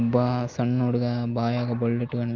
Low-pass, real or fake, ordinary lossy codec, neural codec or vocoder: 7.2 kHz; real; Opus, 24 kbps; none